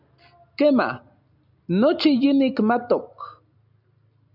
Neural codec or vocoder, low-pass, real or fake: none; 5.4 kHz; real